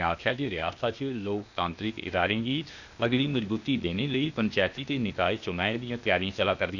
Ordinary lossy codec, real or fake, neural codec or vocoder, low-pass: none; fake; codec, 16 kHz, 0.8 kbps, ZipCodec; 7.2 kHz